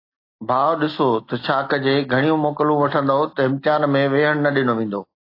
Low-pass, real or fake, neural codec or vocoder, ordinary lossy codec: 5.4 kHz; real; none; AAC, 32 kbps